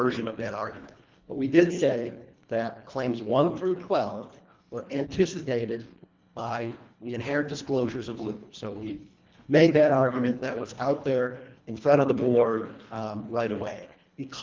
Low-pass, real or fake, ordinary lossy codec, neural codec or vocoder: 7.2 kHz; fake; Opus, 32 kbps; codec, 24 kHz, 1.5 kbps, HILCodec